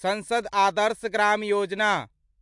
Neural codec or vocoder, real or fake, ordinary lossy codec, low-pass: none; real; MP3, 64 kbps; 10.8 kHz